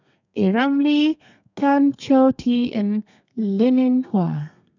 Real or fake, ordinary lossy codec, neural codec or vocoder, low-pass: fake; none; codec, 32 kHz, 1.9 kbps, SNAC; 7.2 kHz